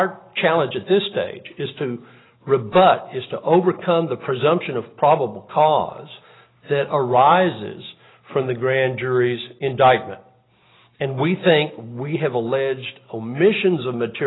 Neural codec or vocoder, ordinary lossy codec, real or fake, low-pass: none; AAC, 16 kbps; real; 7.2 kHz